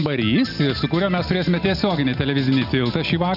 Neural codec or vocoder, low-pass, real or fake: none; 5.4 kHz; real